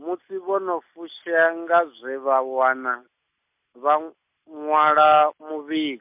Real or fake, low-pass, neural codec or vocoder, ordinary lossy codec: real; 3.6 kHz; none; MP3, 32 kbps